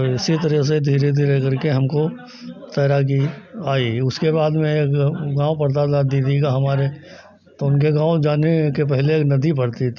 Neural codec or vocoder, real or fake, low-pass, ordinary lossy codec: none; real; 7.2 kHz; none